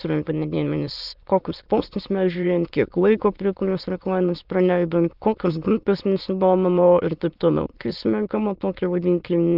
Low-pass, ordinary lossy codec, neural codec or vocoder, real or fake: 5.4 kHz; Opus, 32 kbps; autoencoder, 22.05 kHz, a latent of 192 numbers a frame, VITS, trained on many speakers; fake